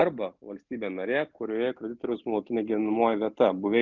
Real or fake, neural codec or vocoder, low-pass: real; none; 7.2 kHz